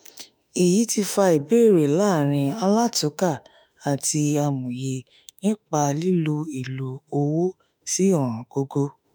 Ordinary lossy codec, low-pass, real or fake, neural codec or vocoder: none; none; fake; autoencoder, 48 kHz, 32 numbers a frame, DAC-VAE, trained on Japanese speech